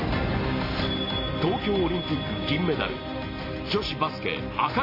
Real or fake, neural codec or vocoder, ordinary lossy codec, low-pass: real; none; AAC, 24 kbps; 5.4 kHz